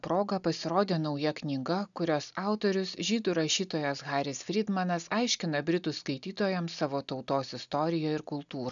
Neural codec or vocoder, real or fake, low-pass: none; real; 7.2 kHz